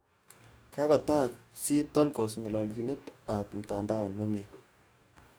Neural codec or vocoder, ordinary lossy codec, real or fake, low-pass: codec, 44.1 kHz, 2.6 kbps, DAC; none; fake; none